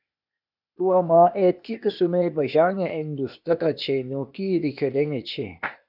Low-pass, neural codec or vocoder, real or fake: 5.4 kHz; codec, 16 kHz, 0.8 kbps, ZipCodec; fake